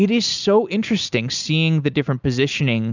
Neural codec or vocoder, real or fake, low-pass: none; real; 7.2 kHz